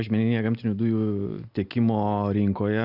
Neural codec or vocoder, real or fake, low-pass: none; real; 5.4 kHz